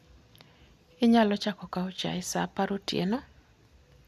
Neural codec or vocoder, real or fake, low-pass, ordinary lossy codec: none; real; 14.4 kHz; none